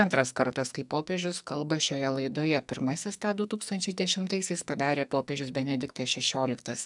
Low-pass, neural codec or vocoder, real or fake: 10.8 kHz; codec, 44.1 kHz, 2.6 kbps, SNAC; fake